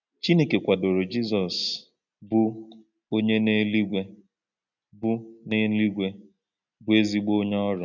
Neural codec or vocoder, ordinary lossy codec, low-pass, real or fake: none; none; 7.2 kHz; real